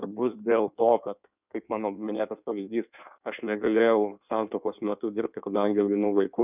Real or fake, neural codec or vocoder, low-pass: fake; codec, 16 kHz in and 24 kHz out, 1.1 kbps, FireRedTTS-2 codec; 3.6 kHz